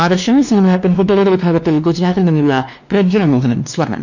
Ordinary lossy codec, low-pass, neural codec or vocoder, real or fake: none; 7.2 kHz; codec, 16 kHz, 2 kbps, X-Codec, WavLM features, trained on Multilingual LibriSpeech; fake